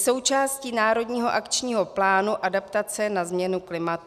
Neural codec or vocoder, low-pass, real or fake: none; 14.4 kHz; real